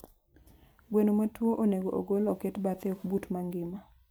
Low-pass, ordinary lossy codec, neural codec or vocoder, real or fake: none; none; vocoder, 44.1 kHz, 128 mel bands every 256 samples, BigVGAN v2; fake